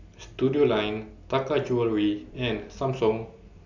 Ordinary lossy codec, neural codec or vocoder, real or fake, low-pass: none; none; real; 7.2 kHz